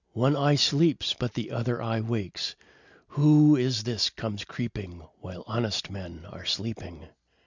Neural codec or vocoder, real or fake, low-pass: none; real; 7.2 kHz